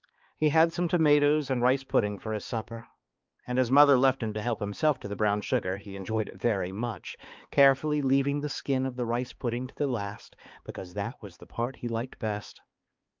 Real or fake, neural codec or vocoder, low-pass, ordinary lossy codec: fake; codec, 16 kHz, 4 kbps, X-Codec, HuBERT features, trained on balanced general audio; 7.2 kHz; Opus, 24 kbps